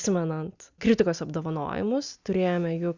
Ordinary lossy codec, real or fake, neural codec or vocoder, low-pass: Opus, 64 kbps; real; none; 7.2 kHz